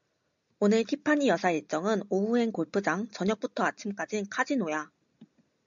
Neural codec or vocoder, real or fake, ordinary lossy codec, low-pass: none; real; MP3, 64 kbps; 7.2 kHz